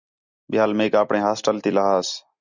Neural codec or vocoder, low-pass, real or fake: none; 7.2 kHz; real